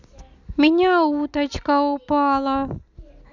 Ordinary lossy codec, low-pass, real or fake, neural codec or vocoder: none; 7.2 kHz; real; none